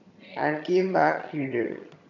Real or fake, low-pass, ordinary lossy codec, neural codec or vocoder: fake; 7.2 kHz; none; vocoder, 22.05 kHz, 80 mel bands, HiFi-GAN